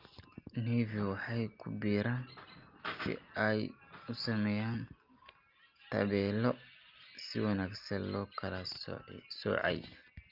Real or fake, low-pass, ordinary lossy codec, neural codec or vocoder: real; 5.4 kHz; Opus, 32 kbps; none